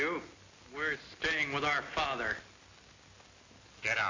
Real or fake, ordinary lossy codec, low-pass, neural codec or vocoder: real; AAC, 32 kbps; 7.2 kHz; none